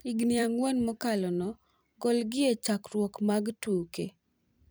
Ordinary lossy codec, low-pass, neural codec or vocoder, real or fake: none; none; vocoder, 44.1 kHz, 128 mel bands every 256 samples, BigVGAN v2; fake